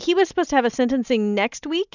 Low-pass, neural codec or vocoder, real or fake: 7.2 kHz; none; real